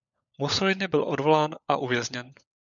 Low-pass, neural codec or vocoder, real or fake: 7.2 kHz; codec, 16 kHz, 16 kbps, FunCodec, trained on LibriTTS, 50 frames a second; fake